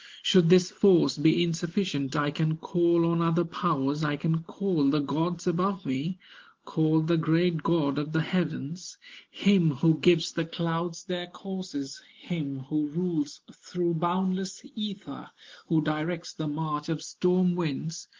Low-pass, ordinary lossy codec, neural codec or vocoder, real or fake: 7.2 kHz; Opus, 16 kbps; none; real